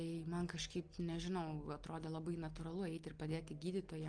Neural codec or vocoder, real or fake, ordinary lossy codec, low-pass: none; real; Opus, 24 kbps; 9.9 kHz